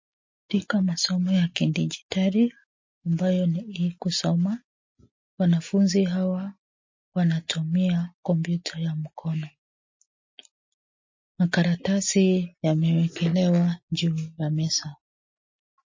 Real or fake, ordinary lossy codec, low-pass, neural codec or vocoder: real; MP3, 32 kbps; 7.2 kHz; none